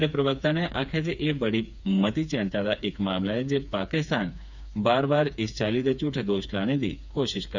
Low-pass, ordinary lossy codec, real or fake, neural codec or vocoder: 7.2 kHz; none; fake; codec, 16 kHz, 4 kbps, FreqCodec, smaller model